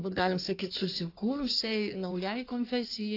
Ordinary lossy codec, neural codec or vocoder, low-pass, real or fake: AAC, 32 kbps; codec, 16 kHz in and 24 kHz out, 1.1 kbps, FireRedTTS-2 codec; 5.4 kHz; fake